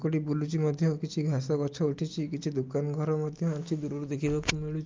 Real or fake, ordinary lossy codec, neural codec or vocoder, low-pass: real; Opus, 24 kbps; none; 7.2 kHz